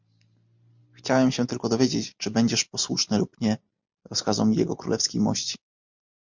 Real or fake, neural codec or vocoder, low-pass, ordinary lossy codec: real; none; 7.2 kHz; MP3, 64 kbps